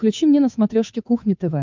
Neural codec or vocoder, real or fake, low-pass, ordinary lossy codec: codec, 16 kHz, 8 kbps, FunCodec, trained on Chinese and English, 25 frames a second; fake; 7.2 kHz; MP3, 64 kbps